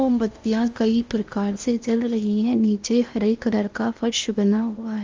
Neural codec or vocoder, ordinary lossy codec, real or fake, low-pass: codec, 16 kHz in and 24 kHz out, 0.8 kbps, FocalCodec, streaming, 65536 codes; Opus, 32 kbps; fake; 7.2 kHz